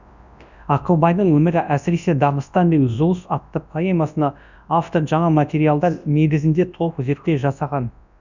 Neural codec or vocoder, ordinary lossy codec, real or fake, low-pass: codec, 24 kHz, 0.9 kbps, WavTokenizer, large speech release; none; fake; 7.2 kHz